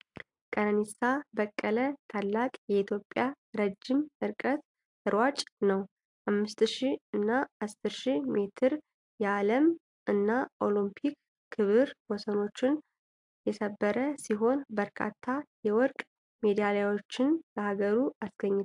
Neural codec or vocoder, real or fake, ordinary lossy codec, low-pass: none; real; AAC, 64 kbps; 10.8 kHz